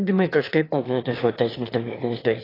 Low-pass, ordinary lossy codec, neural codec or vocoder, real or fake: 5.4 kHz; AAC, 24 kbps; autoencoder, 22.05 kHz, a latent of 192 numbers a frame, VITS, trained on one speaker; fake